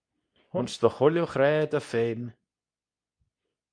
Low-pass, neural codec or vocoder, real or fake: 9.9 kHz; codec, 24 kHz, 0.9 kbps, WavTokenizer, medium speech release version 2; fake